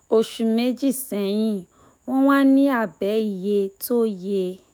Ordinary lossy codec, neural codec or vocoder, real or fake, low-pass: none; autoencoder, 48 kHz, 128 numbers a frame, DAC-VAE, trained on Japanese speech; fake; none